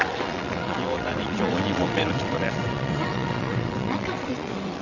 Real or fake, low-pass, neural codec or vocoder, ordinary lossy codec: fake; 7.2 kHz; vocoder, 22.05 kHz, 80 mel bands, WaveNeXt; none